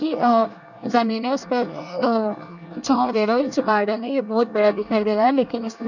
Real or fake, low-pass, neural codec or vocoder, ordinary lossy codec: fake; 7.2 kHz; codec, 24 kHz, 1 kbps, SNAC; none